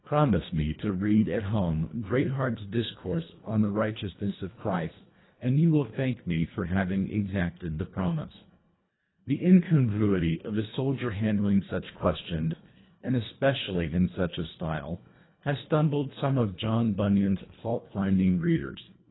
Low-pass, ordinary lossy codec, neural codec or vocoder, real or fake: 7.2 kHz; AAC, 16 kbps; codec, 24 kHz, 1.5 kbps, HILCodec; fake